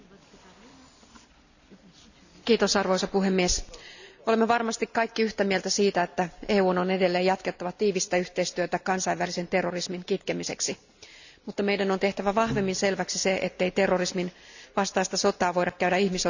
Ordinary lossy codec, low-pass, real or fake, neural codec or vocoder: none; 7.2 kHz; real; none